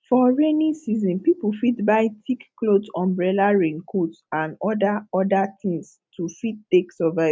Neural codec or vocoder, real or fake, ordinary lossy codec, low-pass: none; real; none; none